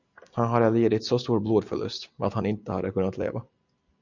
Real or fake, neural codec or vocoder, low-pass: real; none; 7.2 kHz